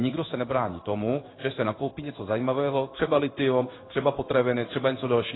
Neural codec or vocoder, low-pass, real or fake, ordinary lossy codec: codec, 16 kHz in and 24 kHz out, 1 kbps, XY-Tokenizer; 7.2 kHz; fake; AAC, 16 kbps